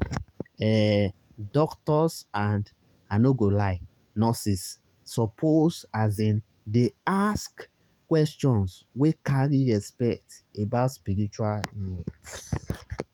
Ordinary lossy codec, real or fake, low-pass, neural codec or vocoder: none; fake; 19.8 kHz; codec, 44.1 kHz, 7.8 kbps, DAC